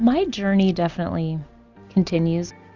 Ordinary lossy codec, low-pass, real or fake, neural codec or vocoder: Opus, 64 kbps; 7.2 kHz; real; none